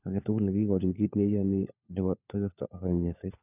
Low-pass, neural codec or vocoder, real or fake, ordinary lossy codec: 3.6 kHz; codec, 16 kHz, 4 kbps, FunCodec, trained on LibriTTS, 50 frames a second; fake; none